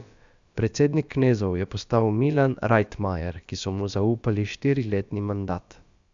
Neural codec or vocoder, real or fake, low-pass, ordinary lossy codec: codec, 16 kHz, about 1 kbps, DyCAST, with the encoder's durations; fake; 7.2 kHz; Opus, 64 kbps